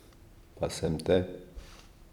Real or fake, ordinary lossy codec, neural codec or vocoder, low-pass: fake; none; vocoder, 44.1 kHz, 128 mel bands every 256 samples, BigVGAN v2; 19.8 kHz